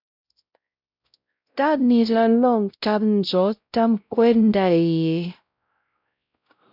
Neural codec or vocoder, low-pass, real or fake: codec, 16 kHz, 0.5 kbps, X-Codec, WavLM features, trained on Multilingual LibriSpeech; 5.4 kHz; fake